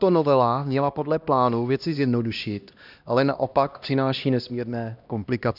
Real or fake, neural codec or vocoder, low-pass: fake; codec, 16 kHz, 1 kbps, X-Codec, HuBERT features, trained on LibriSpeech; 5.4 kHz